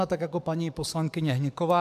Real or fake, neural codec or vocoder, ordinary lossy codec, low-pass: fake; codec, 44.1 kHz, 7.8 kbps, DAC; AAC, 96 kbps; 14.4 kHz